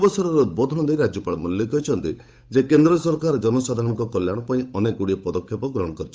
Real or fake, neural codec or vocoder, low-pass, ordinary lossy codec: fake; codec, 16 kHz, 8 kbps, FunCodec, trained on Chinese and English, 25 frames a second; none; none